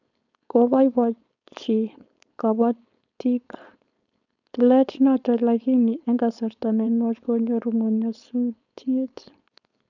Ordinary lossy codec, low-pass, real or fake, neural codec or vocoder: none; 7.2 kHz; fake; codec, 16 kHz, 4.8 kbps, FACodec